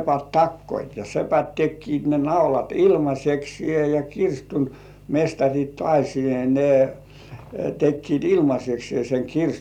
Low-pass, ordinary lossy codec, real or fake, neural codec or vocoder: 19.8 kHz; none; real; none